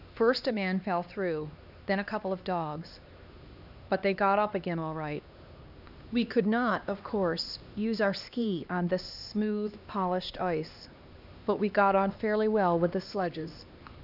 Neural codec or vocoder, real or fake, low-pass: codec, 16 kHz, 2 kbps, X-Codec, HuBERT features, trained on LibriSpeech; fake; 5.4 kHz